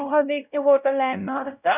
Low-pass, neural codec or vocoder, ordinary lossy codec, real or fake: 3.6 kHz; codec, 16 kHz, 0.5 kbps, X-Codec, WavLM features, trained on Multilingual LibriSpeech; none; fake